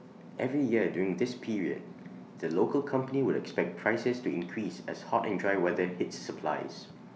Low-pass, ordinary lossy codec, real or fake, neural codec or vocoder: none; none; real; none